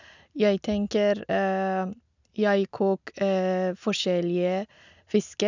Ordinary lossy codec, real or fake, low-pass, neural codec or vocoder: none; real; 7.2 kHz; none